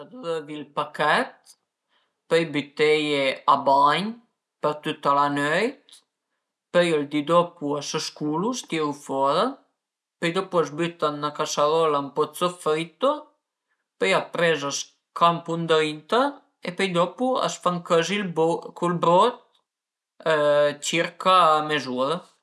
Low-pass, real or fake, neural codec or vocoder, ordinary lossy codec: none; real; none; none